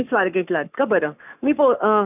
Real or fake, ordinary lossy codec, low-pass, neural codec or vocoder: fake; none; 3.6 kHz; codec, 44.1 kHz, 7.8 kbps, Pupu-Codec